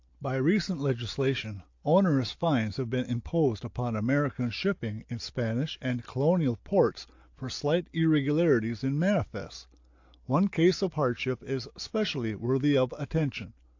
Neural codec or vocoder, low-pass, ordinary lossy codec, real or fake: none; 7.2 kHz; AAC, 48 kbps; real